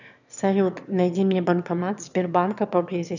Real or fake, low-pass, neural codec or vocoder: fake; 7.2 kHz; autoencoder, 22.05 kHz, a latent of 192 numbers a frame, VITS, trained on one speaker